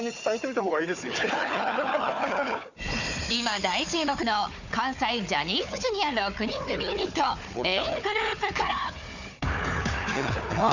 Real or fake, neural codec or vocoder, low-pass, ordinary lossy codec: fake; codec, 16 kHz, 4 kbps, FunCodec, trained on Chinese and English, 50 frames a second; 7.2 kHz; none